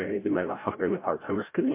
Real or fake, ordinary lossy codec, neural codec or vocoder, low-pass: fake; MP3, 24 kbps; codec, 16 kHz, 0.5 kbps, FreqCodec, larger model; 3.6 kHz